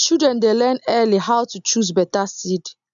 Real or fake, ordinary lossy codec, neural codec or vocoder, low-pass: real; none; none; 7.2 kHz